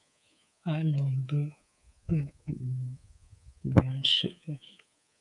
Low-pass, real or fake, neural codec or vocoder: 10.8 kHz; fake; codec, 24 kHz, 3.1 kbps, DualCodec